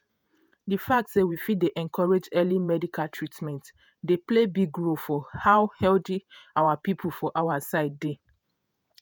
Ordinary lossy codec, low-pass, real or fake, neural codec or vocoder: none; none; fake; vocoder, 48 kHz, 128 mel bands, Vocos